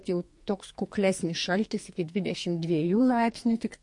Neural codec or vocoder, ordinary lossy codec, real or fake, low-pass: codec, 44.1 kHz, 2.6 kbps, SNAC; MP3, 48 kbps; fake; 10.8 kHz